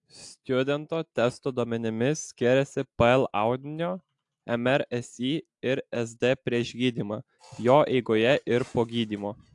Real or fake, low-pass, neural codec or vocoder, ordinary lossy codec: real; 10.8 kHz; none; AAC, 64 kbps